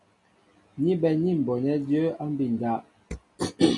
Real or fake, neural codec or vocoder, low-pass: real; none; 10.8 kHz